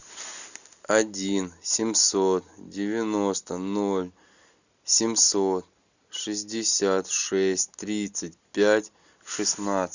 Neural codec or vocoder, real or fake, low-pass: none; real; 7.2 kHz